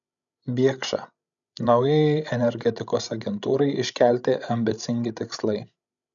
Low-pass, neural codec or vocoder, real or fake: 7.2 kHz; codec, 16 kHz, 16 kbps, FreqCodec, larger model; fake